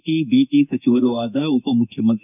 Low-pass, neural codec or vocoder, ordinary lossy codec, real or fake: 3.6 kHz; autoencoder, 48 kHz, 32 numbers a frame, DAC-VAE, trained on Japanese speech; none; fake